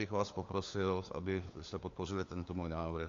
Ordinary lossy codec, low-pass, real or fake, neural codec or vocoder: AAC, 48 kbps; 7.2 kHz; fake; codec, 16 kHz, 4 kbps, FunCodec, trained on LibriTTS, 50 frames a second